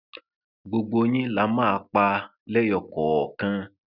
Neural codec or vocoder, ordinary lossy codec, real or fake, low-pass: none; none; real; 5.4 kHz